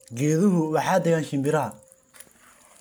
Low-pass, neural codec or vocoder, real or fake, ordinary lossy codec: none; none; real; none